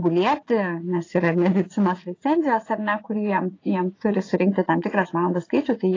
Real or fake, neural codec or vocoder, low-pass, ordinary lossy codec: fake; vocoder, 44.1 kHz, 80 mel bands, Vocos; 7.2 kHz; AAC, 32 kbps